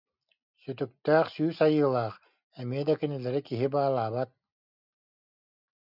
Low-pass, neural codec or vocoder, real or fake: 5.4 kHz; none; real